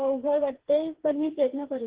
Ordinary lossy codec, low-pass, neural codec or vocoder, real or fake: Opus, 16 kbps; 3.6 kHz; codec, 16 kHz, 4 kbps, FreqCodec, smaller model; fake